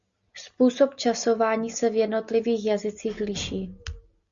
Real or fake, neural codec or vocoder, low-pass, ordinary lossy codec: real; none; 7.2 kHz; Opus, 64 kbps